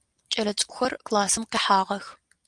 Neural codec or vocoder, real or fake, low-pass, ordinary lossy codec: none; real; 10.8 kHz; Opus, 32 kbps